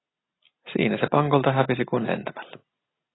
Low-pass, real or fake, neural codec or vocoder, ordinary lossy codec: 7.2 kHz; real; none; AAC, 16 kbps